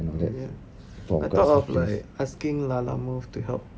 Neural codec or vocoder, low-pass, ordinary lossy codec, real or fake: none; none; none; real